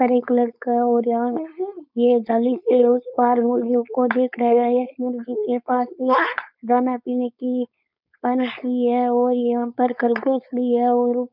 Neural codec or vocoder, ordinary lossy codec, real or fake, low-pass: codec, 16 kHz, 4.8 kbps, FACodec; none; fake; 5.4 kHz